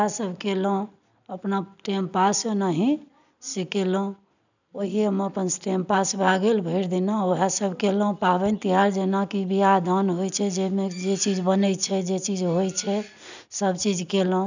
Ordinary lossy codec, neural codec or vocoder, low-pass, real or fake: none; none; 7.2 kHz; real